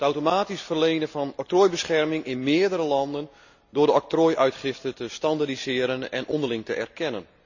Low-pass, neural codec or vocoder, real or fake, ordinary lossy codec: 7.2 kHz; none; real; none